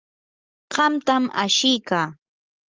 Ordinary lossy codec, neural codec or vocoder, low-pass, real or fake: Opus, 24 kbps; none; 7.2 kHz; real